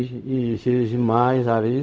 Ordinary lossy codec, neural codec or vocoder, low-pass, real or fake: none; codec, 16 kHz, 0.4 kbps, LongCat-Audio-Codec; none; fake